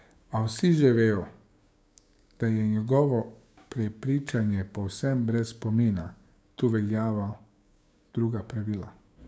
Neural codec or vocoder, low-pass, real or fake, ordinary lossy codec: codec, 16 kHz, 6 kbps, DAC; none; fake; none